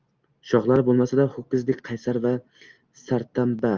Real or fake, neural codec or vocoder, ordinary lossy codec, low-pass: real; none; Opus, 24 kbps; 7.2 kHz